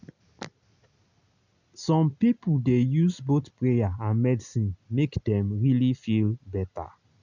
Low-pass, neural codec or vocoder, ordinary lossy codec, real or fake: 7.2 kHz; none; none; real